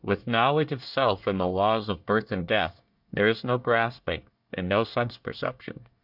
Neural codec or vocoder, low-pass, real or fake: codec, 24 kHz, 1 kbps, SNAC; 5.4 kHz; fake